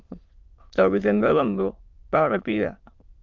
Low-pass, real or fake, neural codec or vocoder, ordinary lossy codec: 7.2 kHz; fake; autoencoder, 22.05 kHz, a latent of 192 numbers a frame, VITS, trained on many speakers; Opus, 32 kbps